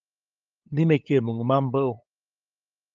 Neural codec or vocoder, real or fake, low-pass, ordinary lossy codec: codec, 16 kHz, 8 kbps, FunCodec, trained on LibriTTS, 25 frames a second; fake; 7.2 kHz; Opus, 24 kbps